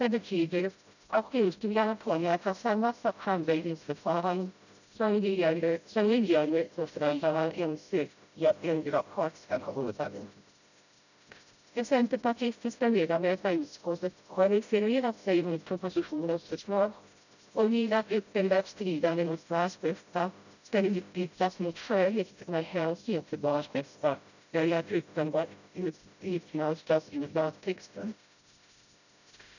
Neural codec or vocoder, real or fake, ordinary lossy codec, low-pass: codec, 16 kHz, 0.5 kbps, FreqCodec, smaller model; fake; none; 7.2 kHz